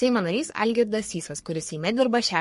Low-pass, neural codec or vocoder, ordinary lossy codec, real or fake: 14.4 kHz; codec, 44.1 kHz, 3.4 kbps, Pupu-Codec; MP3, 48 kbps; fake